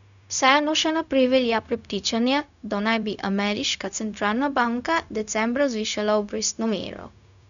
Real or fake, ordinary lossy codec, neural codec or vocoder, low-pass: fake; none; codec, 16 kHz, 0.4 kbps, LongCat-Audio-Codec; 7.2 kHz